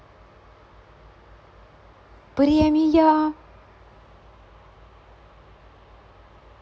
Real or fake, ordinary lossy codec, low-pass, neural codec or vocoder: real; none; none; none